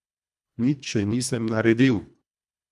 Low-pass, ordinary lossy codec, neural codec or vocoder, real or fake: none; none; codec, 24 kHz, 1.5 kbps, HILCodec; fake